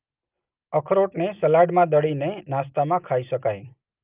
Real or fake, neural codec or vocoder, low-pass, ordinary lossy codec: real; none; 3.6 kHz; Opus, 24 kbps